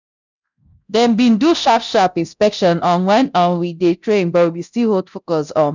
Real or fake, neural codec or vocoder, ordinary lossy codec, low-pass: fake; codec, 24 kHz, 0.9 kbps, DualCodec; none; 7.2 kHz